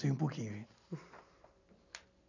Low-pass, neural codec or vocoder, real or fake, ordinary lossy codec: 7.2 kHz; none; real; none